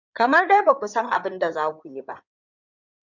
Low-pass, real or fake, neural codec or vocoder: 7.2 kHz; fake; codec, 16 kHz in and 24 kHz out, 2.2 kbps, FireRedTTS-2 codec